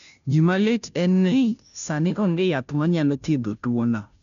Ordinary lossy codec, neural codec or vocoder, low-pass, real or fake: none; codec, 16 kHz, 0.5 kbps, FunCodec, trained on Chinese and English, 25 frames a second; 7.2 kHz; fake